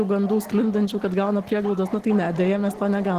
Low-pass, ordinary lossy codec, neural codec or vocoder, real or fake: 14.4 kHz; Opus, 16 kbps; none; real